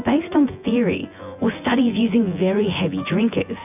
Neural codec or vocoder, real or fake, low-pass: vocoder, 24 kHz, 100 mel bands, Vocos; fake; 3.6 kHz